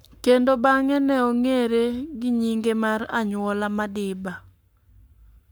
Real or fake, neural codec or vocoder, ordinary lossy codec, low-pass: fake; codec, 44.1 kHz, 7.8 kbps, Pupu-Codec; none; none